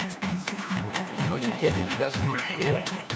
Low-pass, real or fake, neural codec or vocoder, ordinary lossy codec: none; fake; codec, 16 kHz, 1 kbps, FunCodec, trained on LibriTTS, 50 frames a second; none